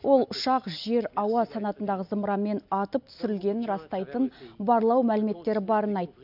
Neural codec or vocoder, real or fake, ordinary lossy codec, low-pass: none; real; AAC, 48 kbps; 5.4 kHz